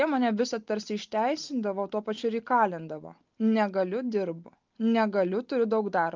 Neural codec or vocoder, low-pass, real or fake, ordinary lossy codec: none; 7.2 kHz; real; Opus, 32 kbps